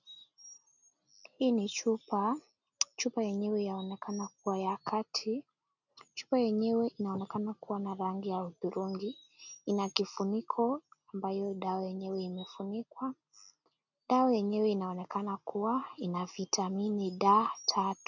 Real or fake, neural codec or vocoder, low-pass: real; none; 7.2 kHz